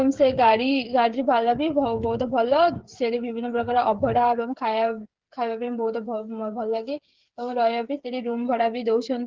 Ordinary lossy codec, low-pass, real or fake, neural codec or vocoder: Opus, 16 kbps; 7.2 kHz; fake; codec, 16 kHz, 8 kbps, FreqCodec, smaller model